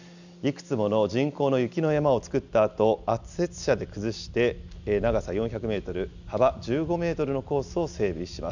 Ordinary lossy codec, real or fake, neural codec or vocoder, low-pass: none; real; none; 7.2 kHz